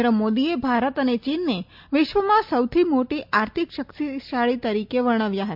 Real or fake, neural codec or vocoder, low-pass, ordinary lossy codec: real; none; 5.4 kHz; MP3, 48 kbps